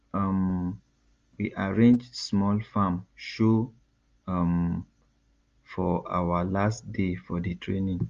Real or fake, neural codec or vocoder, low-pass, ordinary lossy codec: real; none; 7.2 kHz; Opus, 32 kbps